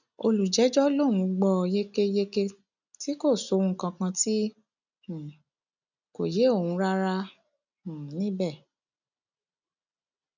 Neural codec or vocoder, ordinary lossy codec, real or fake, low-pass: none; none; real; 7.2 kHz